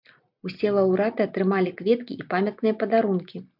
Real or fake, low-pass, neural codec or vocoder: real; 5.4 kHz; none